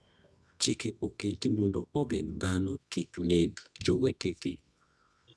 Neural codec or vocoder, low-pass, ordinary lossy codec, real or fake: codec, 24 kHz, 0.9 kbps, WavTokenizer, medium music audio release; none; none; fake